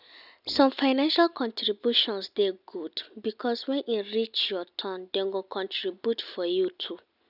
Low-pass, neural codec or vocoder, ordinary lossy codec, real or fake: 5.4 kHz; none; none; real